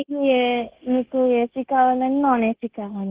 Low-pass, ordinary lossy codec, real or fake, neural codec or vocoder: 3.6 kHz; Opus, 16 kbps; fake; codec, 16 kHz, 0.9 kbps, LongCat-Audio-Codec